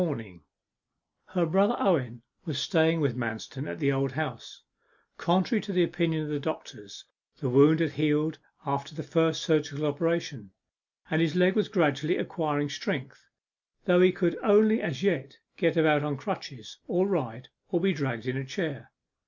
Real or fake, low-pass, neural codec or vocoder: real; 7.2 kHz; none